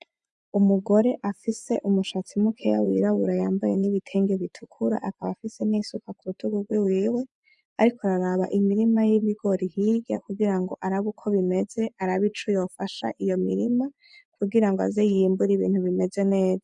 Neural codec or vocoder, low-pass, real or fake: vocoder, 24 kHz, 100 mel bands, Vocos; 10.8 kHz; fake